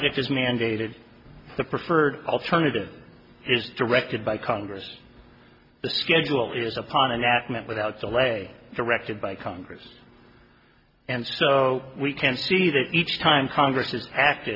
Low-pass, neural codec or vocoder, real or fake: 5.4 kHz; none; real